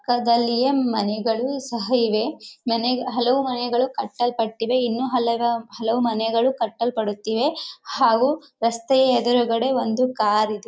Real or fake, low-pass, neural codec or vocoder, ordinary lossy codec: real; none; none; none